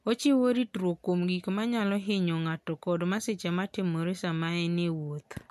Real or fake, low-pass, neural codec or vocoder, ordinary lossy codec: real; 14.4 kHz; none; MP3, 64 kbps